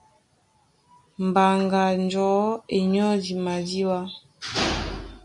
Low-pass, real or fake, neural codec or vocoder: 10.8 kHz; real; none